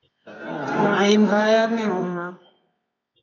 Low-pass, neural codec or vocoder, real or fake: 7.2 kHz; codec, 24 kHz, 0.9 kbps, WavTokenizer, medium music audio release; fake